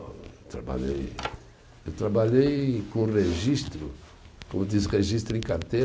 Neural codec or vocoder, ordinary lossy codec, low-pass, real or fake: none; none; none; real